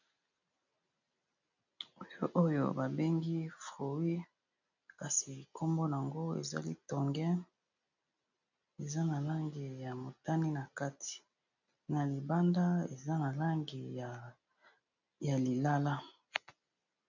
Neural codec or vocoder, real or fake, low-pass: none; real; 7.2 kHz